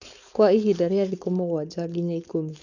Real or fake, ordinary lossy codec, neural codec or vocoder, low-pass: fake; none; codec, 16 kHz, 4.8 kbps, FACodec; 7.2 kHz